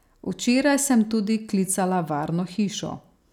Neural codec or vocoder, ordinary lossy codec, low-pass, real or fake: none; none; 19.8 kHz; real